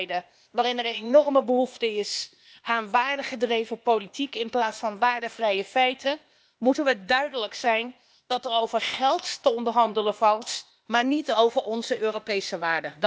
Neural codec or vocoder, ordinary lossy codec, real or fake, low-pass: codec, 16 kHz, 0.8 kbps, ZipCodec; none; fake; none